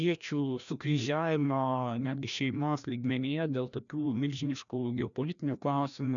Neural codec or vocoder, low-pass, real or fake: codec, 16 kHz, 1 kbps, FreqCodec, larger model; 7.2 kHz; fake